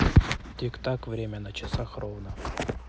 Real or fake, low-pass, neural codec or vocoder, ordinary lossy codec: real; none; none; none